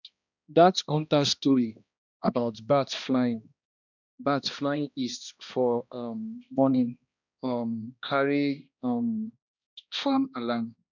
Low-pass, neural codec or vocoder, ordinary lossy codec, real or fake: 7.2 kHz; codec, 16 kHz, 1 kbps, X-Codec, HuBERT features, trained on balanced general audio; none; fake